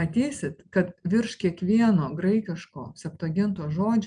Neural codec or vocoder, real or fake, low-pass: none; real; 9.9 kHz